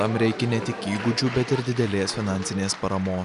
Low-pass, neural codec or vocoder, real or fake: 10.8 kHz; none; real